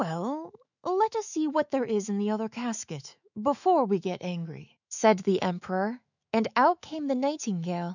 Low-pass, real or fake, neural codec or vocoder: 7.2 kHz; fake; autoencoder, 48 kHz, 128 numbers a frame, DAC-VAE, trained on Japanese speech